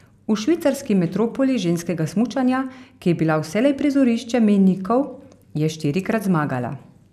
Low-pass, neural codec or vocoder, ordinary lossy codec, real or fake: 14.4 kHz; none; none; real